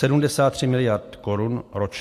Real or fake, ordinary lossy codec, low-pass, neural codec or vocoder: real; AAC, 64 kbps; 14.4 kHz; none